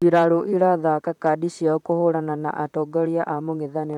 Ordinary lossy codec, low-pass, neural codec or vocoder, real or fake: none; 19.8 kHz; vocoder, 44.1 kHz, 128 mel bands, Pupu-Vocoder; fake